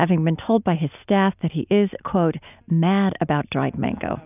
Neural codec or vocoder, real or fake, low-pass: none; real; 3.6 kHz